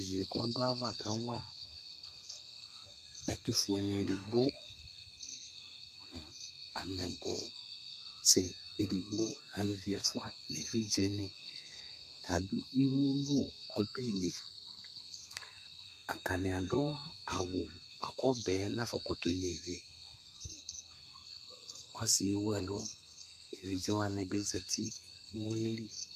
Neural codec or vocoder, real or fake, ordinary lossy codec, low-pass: codec, 32 kHz, 1.9 kbps, SNAC; fake; MP3, 96 kbps; 14.4 kHz